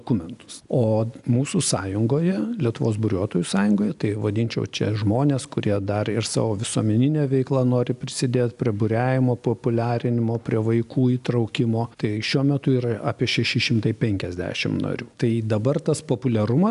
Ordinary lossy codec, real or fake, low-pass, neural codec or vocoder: AAC, 96 kbps; real; 10.8 kHz; none